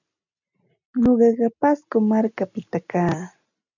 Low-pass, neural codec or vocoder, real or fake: 7.2 kHz; none; real